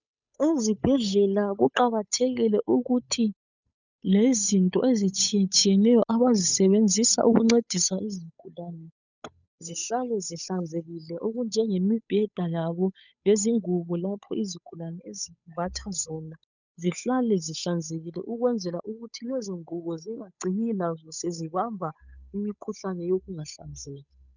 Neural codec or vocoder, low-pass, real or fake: codec, 16 kHz, 8 kbps, FunCodec, trained on Chinese and English, 25 frames a second; 7.2 kHz; fake